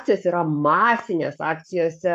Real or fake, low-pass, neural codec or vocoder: fake; 14.4 kHz; codec, 44.1 kHz, 7.8 kbps, Pupu-Codec